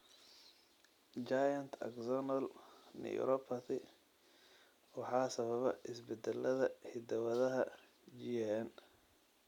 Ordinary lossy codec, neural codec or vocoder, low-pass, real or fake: none; none; 19.8 kHz; real